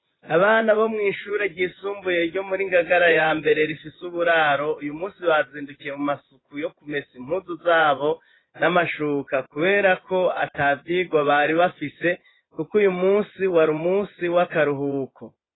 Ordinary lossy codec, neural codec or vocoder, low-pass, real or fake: AAC, 16 kbps; vocoder, 24 kHz, 100 mel bands, Vocos; 7.2 kHz; fake